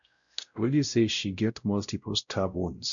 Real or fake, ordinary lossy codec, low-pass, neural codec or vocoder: fake; none; 7.2 kHz; codec, 16 kHz, 0.5 kbps, X-Codec, WavLM features, trained on Multilingual LibriSpeech